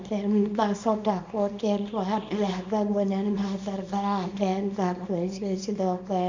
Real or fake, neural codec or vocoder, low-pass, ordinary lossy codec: fake; codec, 24 kHz, 0.9 kbps, WavTokenizer, small release; 7.2 kHz; MP3, 64 kbps